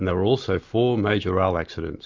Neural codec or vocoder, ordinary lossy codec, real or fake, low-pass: none; MP3, 64 kbps; real; 7.2 kHz